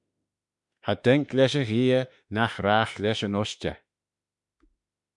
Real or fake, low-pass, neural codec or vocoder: fake; 10.8 kHz; autoencoder, 48 kHz, 32 numbers a frame, DAC-VAE, trained on Japanese speech